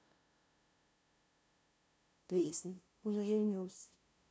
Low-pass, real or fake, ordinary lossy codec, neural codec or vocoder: none; fake; none; codec, 16 kHz, 0.5 kbps, FunCodec, trained on LibriTTS, 25 frames a second